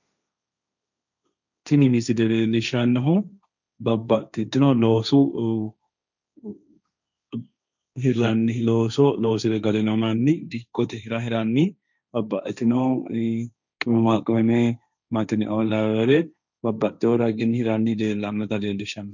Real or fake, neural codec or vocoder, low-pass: fake; codec, 16 kHz, 1.1 kbps, Voila-Tokenizer; 7.2 kHz